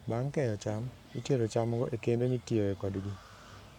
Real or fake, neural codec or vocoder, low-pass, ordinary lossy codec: fake; codec, 44.1 kHz, 7.8 kbps, Pupu-Codec; 19.8 kHz; none